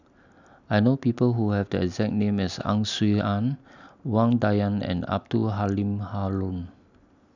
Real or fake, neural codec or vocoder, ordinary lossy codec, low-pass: real; none; none; 7.2 kHz